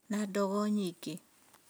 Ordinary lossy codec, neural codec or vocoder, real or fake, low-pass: none; none; real; none